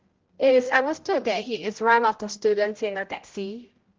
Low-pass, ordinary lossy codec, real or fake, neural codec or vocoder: 7.2 kHz; Opus, 16 kbps; fake; codec, 16 kHz, 0.5 kbps, X-Codec, HuBERT features, trained on general audio